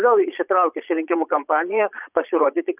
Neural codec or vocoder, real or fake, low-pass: vocoder, 22.05 kHz, 80 mel bands, Vocos; fake; 3.6 kHz